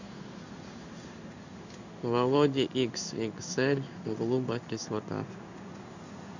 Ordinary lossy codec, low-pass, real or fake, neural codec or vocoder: none; 7.2 kHz; fake; codec, 16 kHz in and 24 kHz out, 1 kbps, XY-Tokenizer